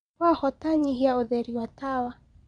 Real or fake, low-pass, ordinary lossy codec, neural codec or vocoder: fake; 9.9 kHz; none; vocoder, 22.05 kHz, 80 mel bands, Vocos